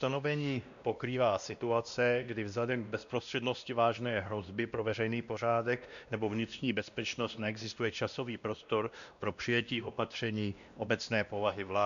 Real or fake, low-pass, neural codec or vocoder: fake; 7.2 kHz; codec, 16 kHz, 1 kbps, X-Codec, WavLM features, trained on Multilingual LibriSpeech